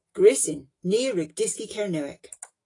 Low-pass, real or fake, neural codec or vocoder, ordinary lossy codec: 10.8 kHz; fake; autoencoder, 48 kHz, 128 numbers a frame, DAC-VAE, trained on Japanese speech; AAC, 32 kbps